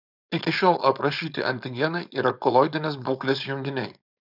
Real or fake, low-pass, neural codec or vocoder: fake; 5.4 kHz; codec, 16 kHz, 4.8 kbps, FACodec